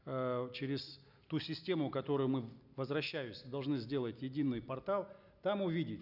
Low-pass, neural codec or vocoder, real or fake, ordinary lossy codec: 5.4 kHz; none; real; none